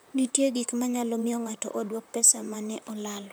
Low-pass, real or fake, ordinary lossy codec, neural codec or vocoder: none; fake; none; vocoder, 44.1 kHz, 128 mel bands, Pupu-Vocoder